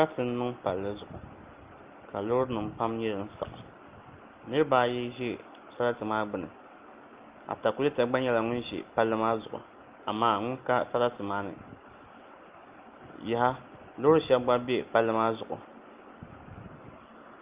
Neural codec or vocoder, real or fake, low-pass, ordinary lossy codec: none; real; 3.6 kHz; Opus, 32 kbps